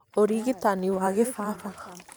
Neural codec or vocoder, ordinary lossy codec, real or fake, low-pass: vocoder, 44.1 kHz, 128 mel bands every 512 samples, BigVGAN v2; none; fake; none